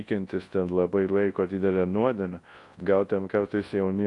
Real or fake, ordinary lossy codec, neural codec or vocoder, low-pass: fake; AAC, 48 kbps; codec, 24 kHz, 0.9 kbps, WavTokenizer, large speech release; 10.8 kHz